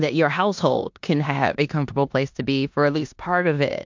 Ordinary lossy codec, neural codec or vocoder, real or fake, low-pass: MP3, 64 kbps; codec, 16 kHz in and 24 kHz out, 0.9 kbps, LongCat-Audio-Codec, four codebook decoder; fake; 7.2 kHz